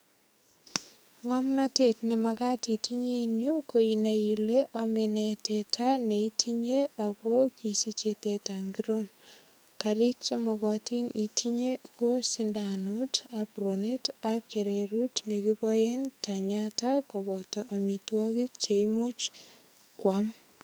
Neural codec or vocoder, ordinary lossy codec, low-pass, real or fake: codec, 44.1 kHz, 2.6 kbps, SNAC; none; none; fake